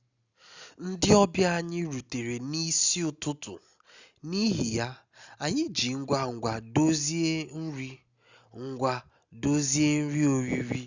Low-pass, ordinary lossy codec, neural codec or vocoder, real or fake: 7.2 kHz; Opus, 64 kbps; none; real